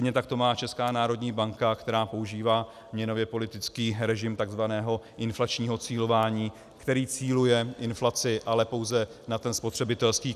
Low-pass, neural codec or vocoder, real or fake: 14.4 kHz; none; real